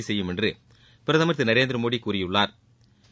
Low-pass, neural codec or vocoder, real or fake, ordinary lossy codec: none; none; real; none